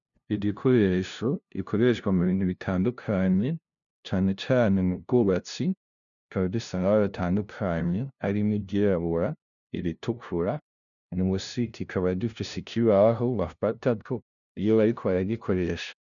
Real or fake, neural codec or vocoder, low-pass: fake; codec, 16 kHz, 0.5 kbps, FunCodec, trained on LibriTTS, 25 frames a second; 7.2 kHz